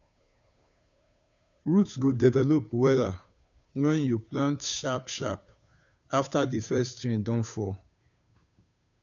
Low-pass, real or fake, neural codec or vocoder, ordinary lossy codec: 7.2 kHz; fake; codec, 16 kHz, 2 kbps, FunCodec, trained on Chinese and English, 25 frames a second; none